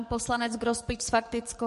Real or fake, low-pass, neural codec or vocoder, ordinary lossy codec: fake; 14.4 kHz; codec, 44.1 kHz, 7.8 kbps, DAC; MP3, 48 kbps